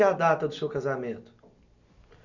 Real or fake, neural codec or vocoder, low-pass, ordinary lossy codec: real; none; 7.2 kHz; none